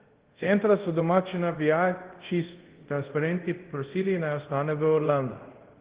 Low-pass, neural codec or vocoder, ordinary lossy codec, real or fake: 3.6 kHz; codec, 24 kHz, 0.5 kbps, DualCodec; Opus, 16 kbps; fake